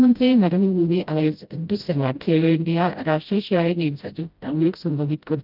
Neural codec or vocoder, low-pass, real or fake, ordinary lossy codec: codec, 16 kHz, 0.5 kbps, FreqCodec, smaller model; 5.4 kHz; fake; Opus, 24 kbps